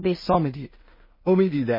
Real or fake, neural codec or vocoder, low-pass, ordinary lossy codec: fake; codec, 16 kHz in and 24 kHz out, 0.4 kbps, LongCat-Audio-Codec, two codebook decoder; 5.4 kHz; MP3, 24 kbps